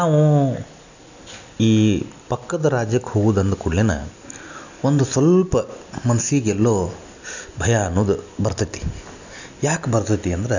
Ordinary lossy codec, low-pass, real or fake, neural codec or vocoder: none; 7.2 kHz; real; none